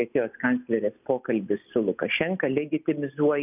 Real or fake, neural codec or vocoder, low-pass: real; none; 3.6 kHz